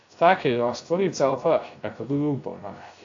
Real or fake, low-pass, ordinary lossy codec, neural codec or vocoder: fake; 7.2 kHz; AAC, 48 kbps; codec, 16 kHz, 0.3 kbps, FocalCodec